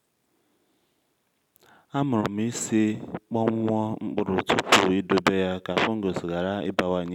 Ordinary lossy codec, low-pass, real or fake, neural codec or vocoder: none; 19.8 kHz; real; none